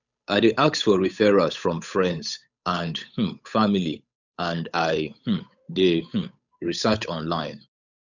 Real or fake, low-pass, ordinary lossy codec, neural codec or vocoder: fake; 7.2 kHz; none; codec, 16 kHz, 8 kbps, FunCodec, trained on Chinese and English, 25 frames a second